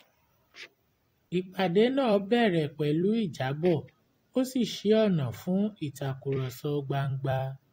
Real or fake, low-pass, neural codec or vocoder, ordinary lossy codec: fake; 19.8 kHz; vocoder, 44.1 kHz, 128 mel bands every 256 samples, BigVGAN v2; AAC, 48 kbps